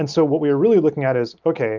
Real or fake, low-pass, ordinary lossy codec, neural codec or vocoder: real; 7.2 kHz; Opus, 24 kbps; none